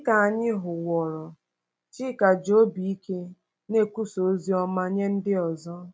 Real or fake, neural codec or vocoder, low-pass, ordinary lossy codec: real; none; none; none